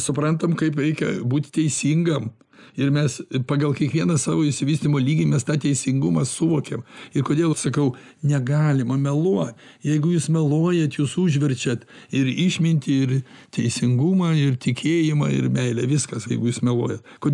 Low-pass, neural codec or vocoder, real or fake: 10.8 kHz; none; real